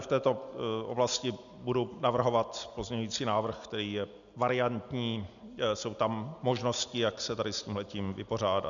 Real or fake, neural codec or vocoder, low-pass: real; none; 7.2 kHz